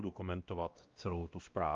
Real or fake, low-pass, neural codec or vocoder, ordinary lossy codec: fake; 7.2 kHz; codec, 16 kHz, 1 kbps, X-Codec, WavLM features, trained on Multilingual LibriSpeech; Opus, 24 kbps